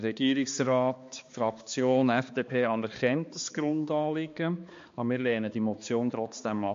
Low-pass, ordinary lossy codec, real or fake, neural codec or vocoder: 7.2 kHz; MP3, 48 kbps; fake; codec, 16 kHz, 2 kbps, X-Codec, HuBERT features, trained on balanced general audio